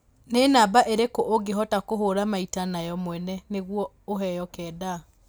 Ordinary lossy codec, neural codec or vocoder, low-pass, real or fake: none; none; none; real